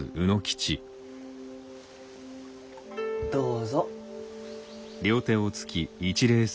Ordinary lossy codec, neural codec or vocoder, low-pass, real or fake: none; none; none; real